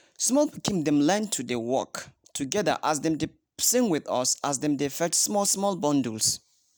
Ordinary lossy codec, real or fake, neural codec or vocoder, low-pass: none; real; none; none